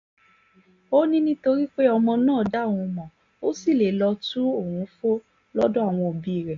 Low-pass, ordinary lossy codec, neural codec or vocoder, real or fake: 7.2 kHz; AAC, 48 kbps; none; real